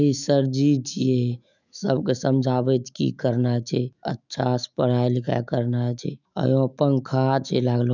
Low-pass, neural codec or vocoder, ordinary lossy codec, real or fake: 7.2 kHz; autoencoder, 48 kHz, 128 numbers a frame, DAC-VAE, trained on Japanese speech; none; fake